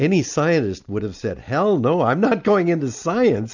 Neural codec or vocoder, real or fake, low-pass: none; real; 7.2 kHz